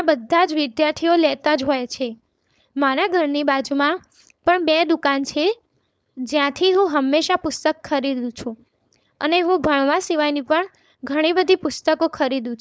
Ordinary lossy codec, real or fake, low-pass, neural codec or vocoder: none; fake; none; codec, 16 kHz, 4.8 kbps, FACodec